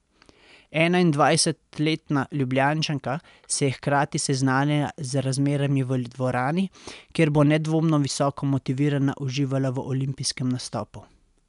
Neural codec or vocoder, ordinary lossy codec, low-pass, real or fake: none; MP3, 96 kbps; 10.8 kHz; real